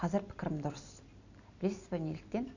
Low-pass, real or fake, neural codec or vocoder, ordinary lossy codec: 7.2 kHz; real; none; none